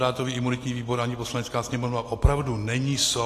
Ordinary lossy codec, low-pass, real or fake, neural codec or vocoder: AAC, 48 kbps; 14.4 kHz; real; none